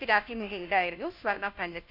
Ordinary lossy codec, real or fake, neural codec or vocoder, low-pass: none; fake; codec, 16 kHz, 0.5 kbps, FunCodec, trained on Chinese and English, 25 frames a second; 5.4 kHz